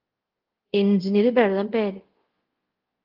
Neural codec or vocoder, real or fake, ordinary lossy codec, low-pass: codec, 24 kHz, 0.5 kbps, DualCodec; fake; Opus, 16 kbps; 5.4 kHz